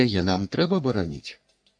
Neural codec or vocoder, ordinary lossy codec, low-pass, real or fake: codec, 44.1 kHz, 3.4 kbps, Pupu-Codec; AAC, 64 kbps; 9.9 kHz; fake